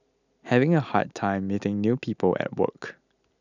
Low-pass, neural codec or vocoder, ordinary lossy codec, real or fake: 7.2 kHz; none; none; real